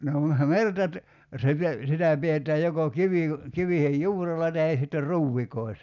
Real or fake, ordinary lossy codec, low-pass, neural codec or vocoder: real; none; 7.2 kHz; none